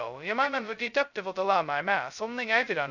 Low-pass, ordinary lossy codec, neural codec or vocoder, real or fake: 7.2 kHz; none; codec, 16 kHz, 0.2 kbps, FocalCodec; fake